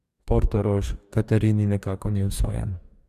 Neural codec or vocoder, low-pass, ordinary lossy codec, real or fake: codec, 44.1 kHz, 2.6 kbps, DAC; 14.4 kHz; Opus, 64 kbps; fake